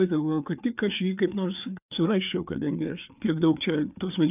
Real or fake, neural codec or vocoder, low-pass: fake; codec, 16 kHz, 4 kbps, FreqCodec, larger model; 3.6 kHz